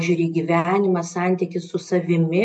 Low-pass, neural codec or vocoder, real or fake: 9.9 kHz; none; real